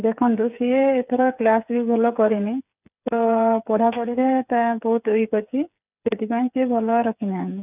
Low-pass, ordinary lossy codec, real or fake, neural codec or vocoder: 3.6 kHz; none; fake; codec, 16 kHz, 8 kbps, FreqCodec, smaller model